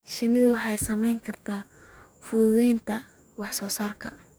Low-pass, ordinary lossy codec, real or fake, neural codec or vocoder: none; none; fake; codec, 44.1 kHz, 2.6 kbps, DAC